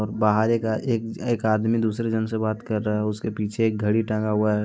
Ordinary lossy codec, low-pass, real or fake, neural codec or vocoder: none; none; real; none